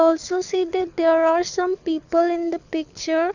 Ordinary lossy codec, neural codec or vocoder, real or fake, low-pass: none; codec, 24 kHz, 6 kbps, HILCodec; fake; 7.2 kHz